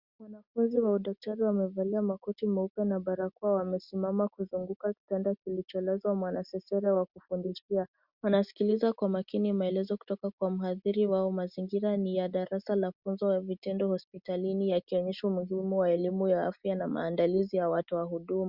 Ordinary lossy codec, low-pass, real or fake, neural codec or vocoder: Opus, 64 kbps; 5.4 kHz; real; none